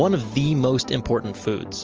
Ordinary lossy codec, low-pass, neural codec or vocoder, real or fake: Opus, 24 kbps; 7.2 kHz; none; real